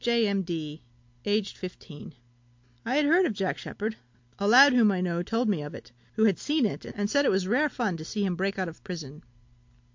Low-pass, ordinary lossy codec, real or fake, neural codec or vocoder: 7.2 kHz; MP3, 48 kbps; real; none